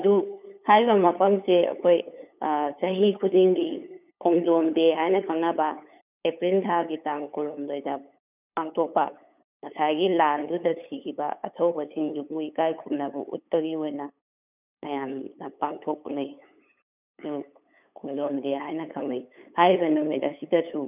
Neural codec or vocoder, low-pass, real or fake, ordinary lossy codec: codec, 16 kHz, 8 kbps, FunCodec, trained on LibriTTS, 25 frames a second; 3.6 kHz; fake; none